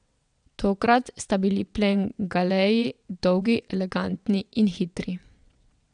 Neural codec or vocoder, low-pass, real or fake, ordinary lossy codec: vocoder, 22.05 kHz, 80 mel bands, WaveNeXt; 9.9 kHz; fake; none